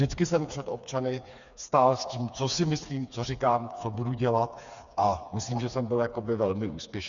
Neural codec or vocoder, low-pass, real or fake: codec, 16 kHz, 4 kbps, FreqCodec, smaller model; 7.2 kHz; fake